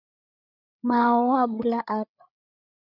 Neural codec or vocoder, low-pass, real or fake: codec, 16 kHz, 8 kbps, FreqCodec, larger model; 5.4 kHz; fake